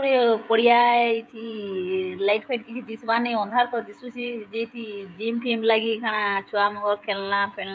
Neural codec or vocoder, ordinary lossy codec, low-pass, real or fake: codec, 16 kHz, 16 kbps, FreqCodec, smaller model; none; none; fake